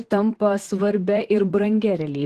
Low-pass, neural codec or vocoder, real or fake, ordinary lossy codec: 14.4 kHz; vocoder, 44.1 kHz, 128 mel bands, Pupu-Vocoder; fake; Opus, 16 kbps